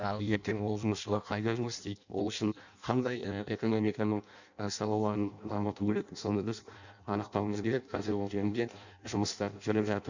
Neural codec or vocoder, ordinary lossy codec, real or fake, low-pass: codec, 16 kHz in and 24 kHz out, 0.6 kbps, FireRedTTS-2 codec; none; fake; 7.2 kHz